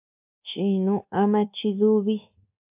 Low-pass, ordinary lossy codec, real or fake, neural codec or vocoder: 3.6 kHz; AAC, 32 kbps; fake; codec, 24 kHz, 1.2 kbps, DualCodec